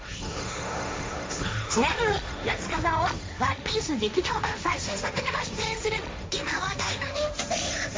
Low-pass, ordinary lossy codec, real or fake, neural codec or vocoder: none; none; fake; codec, 16 kHz, 1.1 kbps, Voila-Tokenizer